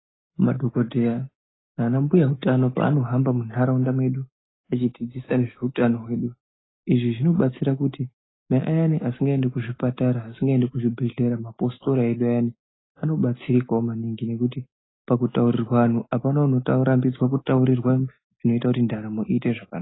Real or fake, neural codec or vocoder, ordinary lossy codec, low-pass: real; none; AAC, 16 kbps; 7.2 kHz